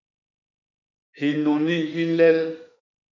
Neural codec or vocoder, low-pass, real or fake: autoencoder, 48 kHz, 32 numbers a frame, DAC-VAE, trained on Japanese speech; 7.2 kHz; fake